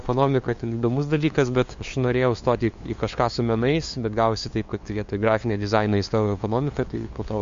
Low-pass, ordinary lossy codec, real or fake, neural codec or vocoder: 7.2 kHz; MP3, 48 kbps; fake; codec, 16 kHz, 2 kbps, FunCodec, trained on LibriTTS, 25 frames a second